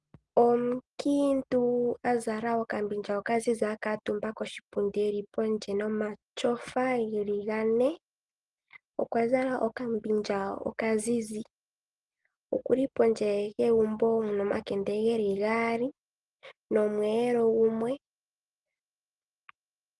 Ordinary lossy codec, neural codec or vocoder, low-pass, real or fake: Opus, 24 kbps; none; 10.8 kHz; real